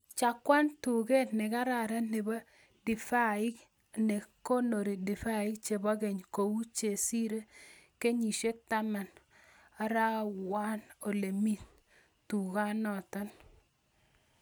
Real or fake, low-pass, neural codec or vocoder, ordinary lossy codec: real; none; none; none